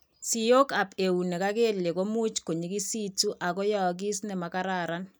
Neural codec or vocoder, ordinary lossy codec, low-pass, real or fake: none; none; none; real